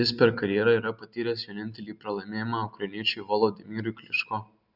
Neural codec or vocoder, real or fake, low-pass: none; real; 5.4 kHz